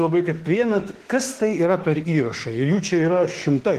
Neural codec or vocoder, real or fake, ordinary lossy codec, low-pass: autoencoder, 48 kHz, 32 numbers a frame, DAC-VAE, trained on Japanese speech; fake; Opus, 16 kbps; 14.4 kHz